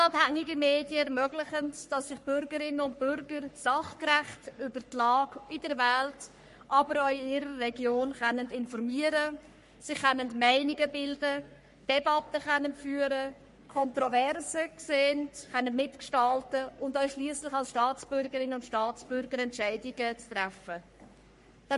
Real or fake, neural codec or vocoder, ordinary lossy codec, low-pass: fake; codec, 44.1 kHz, 3.4 kbps, Pupu-Codec; MP3, 48 kbps; 14.4 kHz